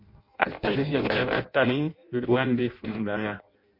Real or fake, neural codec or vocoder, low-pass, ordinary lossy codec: fake; codec, 16 kHz in and 24 kHz out, 0.6 kbps, FireRedTTS-2 codec; 5.4 kHz; MP3, 32 kbps